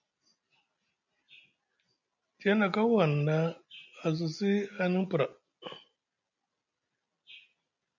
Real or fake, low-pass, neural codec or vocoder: real; 7.2 kHz; none